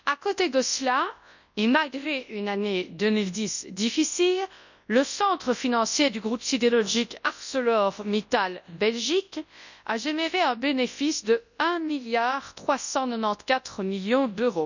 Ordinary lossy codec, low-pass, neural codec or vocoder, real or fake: none; 7.2 kHz; codec, 24 kHz, 0.9 kbps, WavTokenizer, large speech release; fake